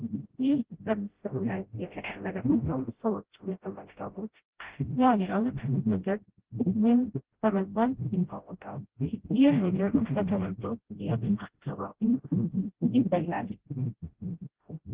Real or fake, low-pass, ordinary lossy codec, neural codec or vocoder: fake; 3.6 kHz; Opus, 16 kbps; codec, 16 kHz, 0.5 kbps, FreqCodec, smaller model